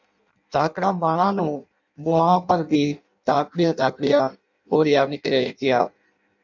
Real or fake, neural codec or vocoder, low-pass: fake; codec, 16 kHz in and 24 kHz out, 0.6 kbps, FireRedTTS-2 codec; 7.2 kHz